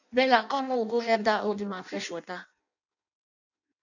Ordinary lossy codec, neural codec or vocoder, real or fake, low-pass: none; codec, 16 kHz in and 24 kHz out, 0.6 kbps, FireRedTTS-2 codec; fake; 7.2 kHz